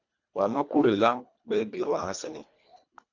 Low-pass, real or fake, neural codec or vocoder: 7.2 kHz; fake; codec, 24 kHz, 1.5 kbps, HILCodec